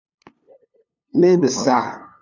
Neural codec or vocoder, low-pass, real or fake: codec, 16 kHz, 2 kbps, FunCodec, trained on LibriTTS, 25 frames a second; 7.2 kHz; fake